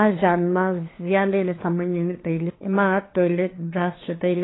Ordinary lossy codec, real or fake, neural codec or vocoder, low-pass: AAC, 16 kbps; fake; codec, 16 kHz, 2 kbps, FunCodec, trained on LibriTTS, 25 frames a second; 7.2 kHz